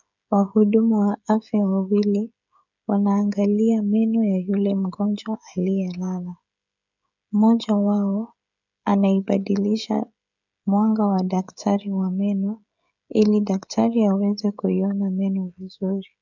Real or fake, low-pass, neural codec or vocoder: fake; 7.2 kHz; codec, 16 kHz, 16 kbps, FreqCodec, smaller model